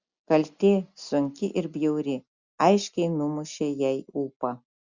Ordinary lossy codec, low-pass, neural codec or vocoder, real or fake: Opus, 64 kbps; 7.2 kHz; none; real